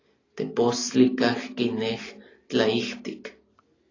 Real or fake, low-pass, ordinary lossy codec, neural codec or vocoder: real; 7.2 kHz; AAC, 32 kbps; none